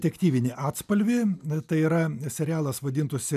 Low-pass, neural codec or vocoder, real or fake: 14.4 kHz; none; real